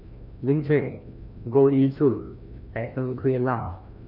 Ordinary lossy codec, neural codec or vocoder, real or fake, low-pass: none; codec, 16 kHz, 1 kbps, FreqCodec, larger model; fake; 5.4 kHz